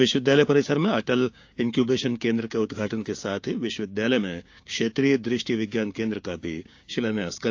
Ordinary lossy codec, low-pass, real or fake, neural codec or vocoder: AAC, 48 kbps; 7.2 kHz; fake; codec, 16 kHz, 6 kbps, DAC